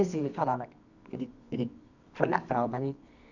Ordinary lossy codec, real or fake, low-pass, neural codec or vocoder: none; fake; 7.2 kHz; codec, 24 kHz, 0.9 kbps, WavTokenizer, medium music audio release